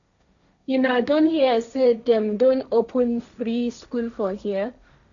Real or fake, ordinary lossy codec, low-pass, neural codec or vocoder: fake; none; 7.2 kHz; codec, 16 kHz, 1.1 kbps, Voila-Tokenizer